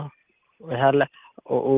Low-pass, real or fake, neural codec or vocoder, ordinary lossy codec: 3.6 kHz; real; none; Opus, 32 kbps